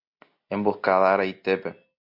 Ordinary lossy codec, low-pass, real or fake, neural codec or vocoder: MP3, 48 kbps; 5.4 kHz; real; none